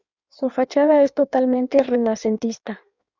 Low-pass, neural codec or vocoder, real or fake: 7.2 kHz; codec, 16 kHz in and 24 kHz out, 1.1 kbps, FireRedTTS-2 codec; fake